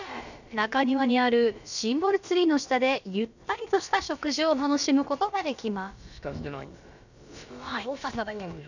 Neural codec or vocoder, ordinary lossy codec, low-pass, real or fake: codec, 16 kHz, about 1 kbps, DyCAST, with the encoder's durations; none; 7.2 kHz; fake